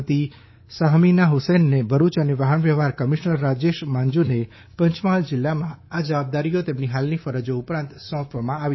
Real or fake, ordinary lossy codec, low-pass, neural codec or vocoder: fake; MP3, 24 kbps; 7.2 kHz; codec, 24 kHz, 3.1 kbps, DualCodec